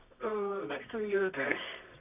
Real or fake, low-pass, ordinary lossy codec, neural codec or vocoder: fake; 3.6 kHz; none; codec, 24 kHz, 0.9 kbps, WavTokenizer, medium music audio release